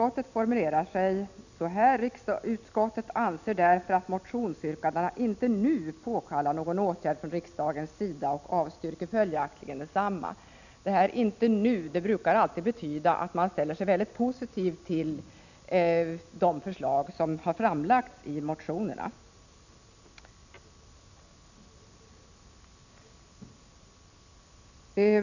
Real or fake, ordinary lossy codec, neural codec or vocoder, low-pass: real; none; none; 7.2 kHz